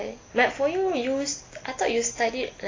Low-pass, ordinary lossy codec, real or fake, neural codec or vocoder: 7.2 kHz; AAC, 32 kbps; real; none